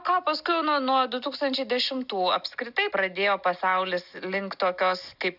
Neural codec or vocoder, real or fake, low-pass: none; real; 5.4 kHz